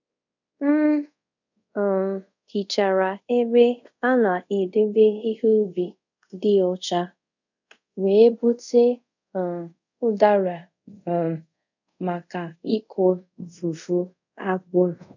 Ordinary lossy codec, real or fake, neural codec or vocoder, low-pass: none; fake; codec, 24 kHz, 0.5 kbps, DualCodec; 7.2 kHz